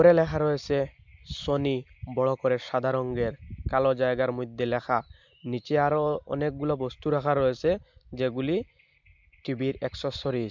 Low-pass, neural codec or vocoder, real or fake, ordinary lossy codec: 7.2 kHz; none; real; MP3, 64 kbps